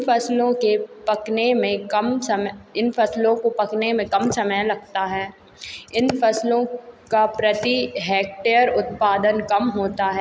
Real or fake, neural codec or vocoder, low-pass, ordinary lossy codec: real; none; none; none